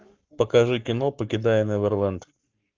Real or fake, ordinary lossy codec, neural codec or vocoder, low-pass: fake; Opus, 32 kbps; codec, 44.1 kHz, 7.8 kbps, Pupu-Codec; 7.2 kHz